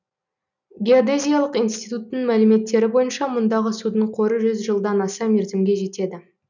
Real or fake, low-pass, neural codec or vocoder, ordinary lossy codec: real; 7.2 kHz; none; none